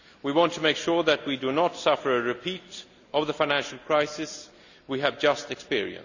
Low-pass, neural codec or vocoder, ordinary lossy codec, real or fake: 7.2 kHz; none; none; real